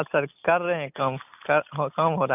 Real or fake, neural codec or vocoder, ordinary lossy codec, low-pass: real; none; none; 3.6 kHz